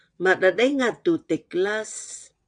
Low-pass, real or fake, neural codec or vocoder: 9.9 kHz; fake; vocoder, 22.05 kHz, 80 mel bands, WaveNeXt